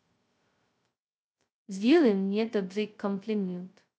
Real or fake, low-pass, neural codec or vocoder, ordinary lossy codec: fake; none; codec, 16 kHz, 0.2 kbps, FocalCodec; none